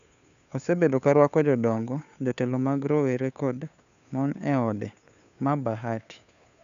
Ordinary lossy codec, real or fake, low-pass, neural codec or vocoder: none; fake; 7.2 kHz; codec, 16 kHz, 2 kbps, FunCodec, trained on Chinese and English, 25 frames a second